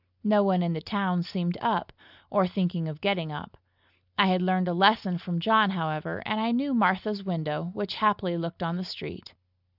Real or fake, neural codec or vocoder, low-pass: real; none; 5.4 kHz